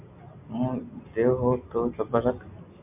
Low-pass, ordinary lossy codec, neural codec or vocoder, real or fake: 3.6 kHz; MP3, 32 kbps; none; real